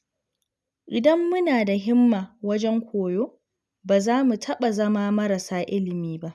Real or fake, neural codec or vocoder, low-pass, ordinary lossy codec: real; none; none; none